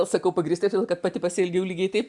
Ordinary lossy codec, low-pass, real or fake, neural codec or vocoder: MP3, 96 kbps; 10.8 kHz; real; none